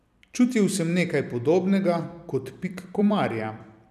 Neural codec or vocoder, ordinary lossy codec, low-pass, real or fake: vocoder, 44.1 kHz, 128 mel bands every 256 samples, BigVGAN v2; none; 14.4 kHz; fake